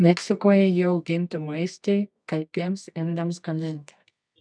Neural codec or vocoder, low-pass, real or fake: codec, 24 kHz, 0.9 kbps, WavTokenizer, medium music audio release; 9.9 kHz; fake